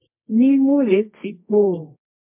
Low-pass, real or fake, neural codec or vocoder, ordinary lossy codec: 3.6 kHz; fake; codec, 24 kHz, 0.9 kbps, WavTokenizer, medium music audio release; MP3, 32 kbps